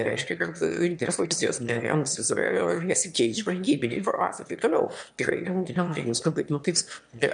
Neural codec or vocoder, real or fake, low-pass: autoencoder, 22.05 kHz, a latent of 192 numbers a frame, VITS, trained on one speaker; fake; 9.9 kHz